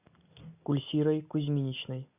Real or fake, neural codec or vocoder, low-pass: real; none; 3.6 kHz